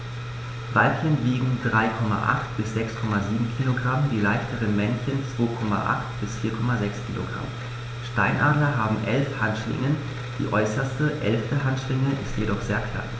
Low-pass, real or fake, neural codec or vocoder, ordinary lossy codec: none; real; none; none